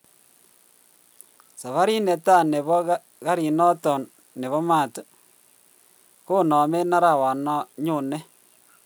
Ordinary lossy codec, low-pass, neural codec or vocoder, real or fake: none; none; none; real